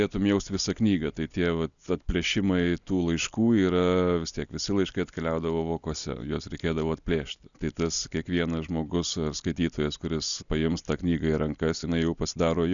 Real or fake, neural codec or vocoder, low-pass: real; none; 7.2 kHz